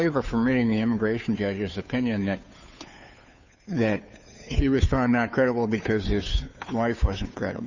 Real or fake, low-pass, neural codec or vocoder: fake; 7.2 kHz; codec, 16 kHz, 2 kbps, FunCodec, trained on Chinese and English, 25 frames a second